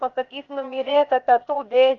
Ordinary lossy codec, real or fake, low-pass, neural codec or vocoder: Opus, 64 kbps; fake; 7.2 kHz; codec, 16 kHz, 0.8 kbps, ZipCodec